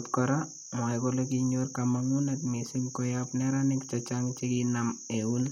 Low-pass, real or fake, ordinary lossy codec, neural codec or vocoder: 19.8 kHz; real; MP3, 64 kbps; none